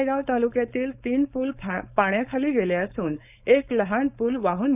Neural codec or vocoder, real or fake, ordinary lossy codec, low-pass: codec, 16 kHz, 4.8 kbps, FACodec; fake; none; 3.6 kHz